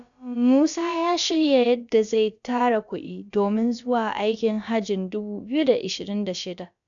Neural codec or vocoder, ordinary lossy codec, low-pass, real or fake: codec, 16 kHz, about 1 kbps, DyCAST, with the encoder's durations; none; 7.2 kHz; fake